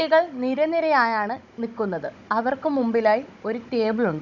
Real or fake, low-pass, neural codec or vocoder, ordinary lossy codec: fake; 7.2 kHz; codec, 16 kHz, 16 kbps, FunCodec, trained on Chinese and English, 50 frames a second; none